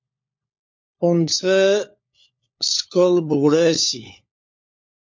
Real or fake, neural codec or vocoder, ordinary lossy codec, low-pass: fake; codec, 16 kHz, 4 kbps, FunCodec, trained on LibriTTS, 50 frames a second; MP3, 48 kbps; 7.2 kHz